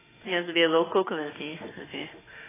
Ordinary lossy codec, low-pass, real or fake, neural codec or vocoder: AAC, 16 kbps; 3.6 kHz; fake; autoencoder, 48 kHz, 32 numbers a frame, DAC-VAE, trained on Japanese speech